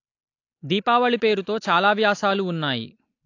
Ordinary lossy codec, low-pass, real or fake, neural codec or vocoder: AAC, 48 kbps; 7.2 kHz; real; none